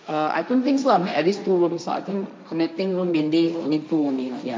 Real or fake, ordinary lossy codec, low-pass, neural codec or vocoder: fake; none; none; codec, 16 kHz, 1.1 kbps, Voila-Tokenizer